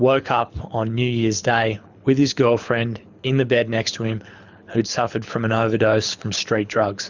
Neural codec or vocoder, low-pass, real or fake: codec, 24 kHz, 6 kbps, HILCodec; 7.2 kHz; fake